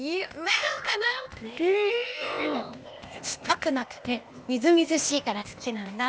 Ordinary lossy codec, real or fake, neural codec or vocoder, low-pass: none; fake; codec, 16 kHz, 0.8 kbps, ZipCodec; none